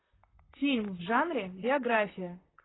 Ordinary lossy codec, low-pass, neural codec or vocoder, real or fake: AAC, 16 kbps; 7.2 kHz; vocoder, 44.1 kHz, 128 mel bands, Pupu-Vocoder; fake